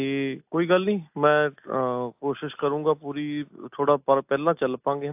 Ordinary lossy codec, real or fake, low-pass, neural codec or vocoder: AAC, 32 kbps; real; 3.6 kHz; none